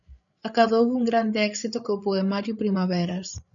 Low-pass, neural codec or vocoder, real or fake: 7.2 kHz; codec, 16 kHz, 8 kbps, FreqCodec, larger model; fake